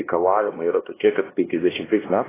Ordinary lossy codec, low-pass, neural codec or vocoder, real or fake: AAC, 16 kbps; 3.6 kHz; codec, 16 kHz, 1 kbps, X-Codec, HuBERT features, trained on LibriSpeech; fake